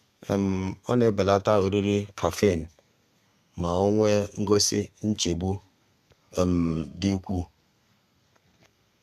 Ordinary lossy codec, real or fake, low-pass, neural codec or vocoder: none; fake; 14.4 kHz; codec, 32 kHz, 1.9 kbps, SNAC